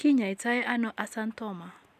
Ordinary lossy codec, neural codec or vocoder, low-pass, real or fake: none; none; 14.4 kHz; real